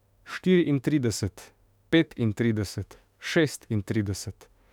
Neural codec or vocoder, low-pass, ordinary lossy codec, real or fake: autoencoder, 48 kHz, 32 numbers a frame, DAC-VAE, trained on Japanese speech; 19.8 kHz; none; fake